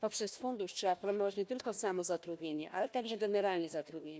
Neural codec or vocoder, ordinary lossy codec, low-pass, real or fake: codec, 16 kHz, 1 kbps, FunCodec, trained on Chinese and English, 50 frames a second; none; none; fake